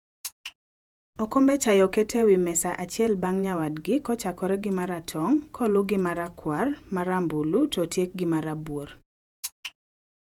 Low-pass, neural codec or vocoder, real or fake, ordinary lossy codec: 19.8 kHz; vocoder, 48 kHz, 128 mel bands, Vocos; fake; none